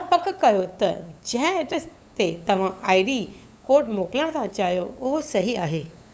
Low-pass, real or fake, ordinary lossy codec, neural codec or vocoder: none; fake; none; codec, 16 kHz, 8 kbps, FunCodec, trained on LibriTTS, 25 frames a second